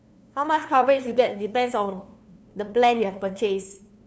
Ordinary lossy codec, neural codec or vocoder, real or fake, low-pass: none; codec, 16 kHz, 2 kbps, FunCodec, trained on LibriTTS, 25 frames a second; fake; none